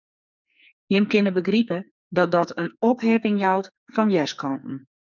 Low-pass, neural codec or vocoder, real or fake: 7.2 kHz; codec, 44.1 kHz, 2.6 kbps, SNAC; fake